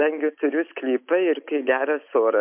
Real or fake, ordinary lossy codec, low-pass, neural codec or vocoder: real; MP3, 32 kbps; 3.6 kHz; none